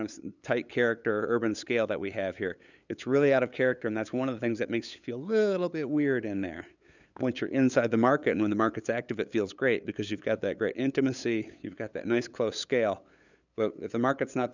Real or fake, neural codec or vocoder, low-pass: fake; codec, 16 kHz, 8 kbps, FunCodec, trained on LibriTTS, 25 frames a second; 7.2 kHz